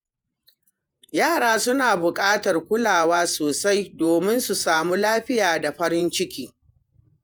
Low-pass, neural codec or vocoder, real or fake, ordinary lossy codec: none; vocoder, 48 kHz, 128 mel bands, Vocos; fake; none